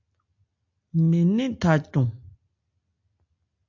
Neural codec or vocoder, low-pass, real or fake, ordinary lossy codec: none; 7.2 kHz; real; AAC, 48 kbps